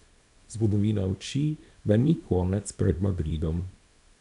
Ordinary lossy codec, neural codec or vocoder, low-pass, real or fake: none; codec, 24 kHz, 0.9 kbps, WavTokenizer, small release; 10.8 kHz; fake